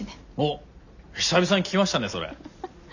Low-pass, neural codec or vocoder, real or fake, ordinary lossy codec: 7.2 kHz; none; real; none